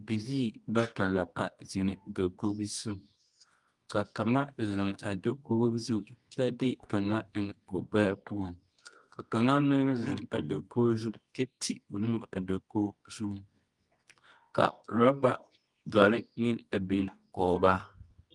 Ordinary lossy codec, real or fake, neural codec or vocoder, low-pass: Opus, 32 kbps; fake; codec, 24 kHz, 0.9 kbps, WavTokenizer, medium music audio release; 10.8 kHz